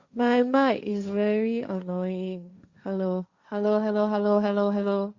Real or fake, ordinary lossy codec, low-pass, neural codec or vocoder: fake; Opus, 64 kbps; 7.2 kHz; codec, 16 kHz, 1.1 kbps, Voila-Tokenizer